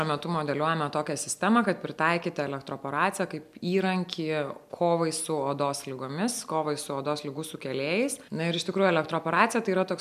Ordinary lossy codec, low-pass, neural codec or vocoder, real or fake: MP3, 96 kbps; 14.4 kHz; none; real